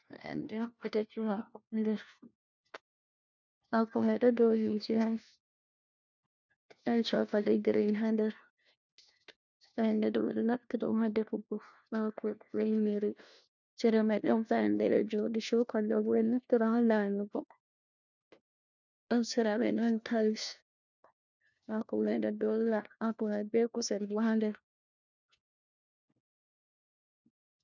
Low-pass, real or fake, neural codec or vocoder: 7.2 kHz; fake; codec, 16 kHz, 1 kbps, FunCodec, trained on LibriTTS, 50 frames a second